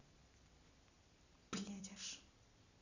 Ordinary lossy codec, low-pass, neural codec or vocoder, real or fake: none; 7.2 kHz; none; real